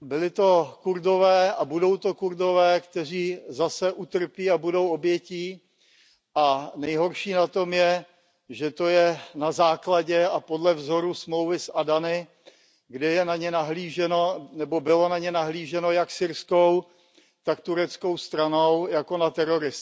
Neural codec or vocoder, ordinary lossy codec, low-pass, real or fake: none; none; none; real